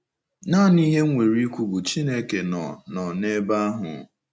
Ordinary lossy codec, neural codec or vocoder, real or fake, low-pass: none; none; real; none